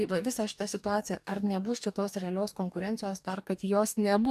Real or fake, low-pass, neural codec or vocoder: fake; 14.4 kHz; codec, 44.1 kHz, 2.6 kbps, DAC